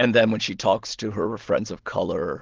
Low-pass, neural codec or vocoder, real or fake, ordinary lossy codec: 7.2 kHz; none; real; Opus, 16 kbps